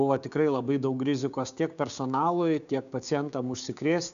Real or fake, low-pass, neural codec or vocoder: fake; 7.2 kHz; codec, 16 kHz, 6 kbps, DAC